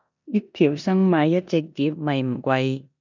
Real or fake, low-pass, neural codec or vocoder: fake; 7.2 kHz; codec, 16 kHz in and 24 kHz out, 0.9 kbps, LongCat-Audio-Codec, four codebook decoder